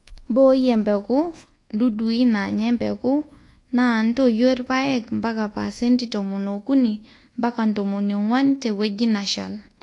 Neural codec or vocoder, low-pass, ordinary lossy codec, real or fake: codec, 24 kHz, 1.2 kbps, DualCodec; 10.8 kHz; AAC, 48 kbps; fake